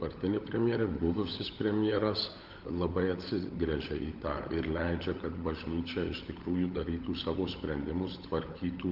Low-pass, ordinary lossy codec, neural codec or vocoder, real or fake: 5.4 kHz; Opus, 24 kbps; codec, 16 kHz, 16 kbps, FunCodec, trained on LibriTTS, 50 frames a second; fake